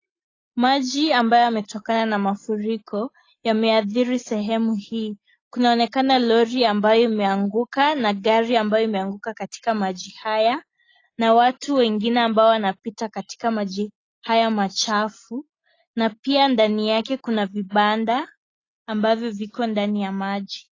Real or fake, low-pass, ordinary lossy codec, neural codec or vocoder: real; 7.2 kHz; AAC, 32 kbps; none